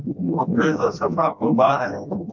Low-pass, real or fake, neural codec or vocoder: 7.2 kHz; fake; codec, 16 kHz, 1 kbps, FreqCodec, smaller model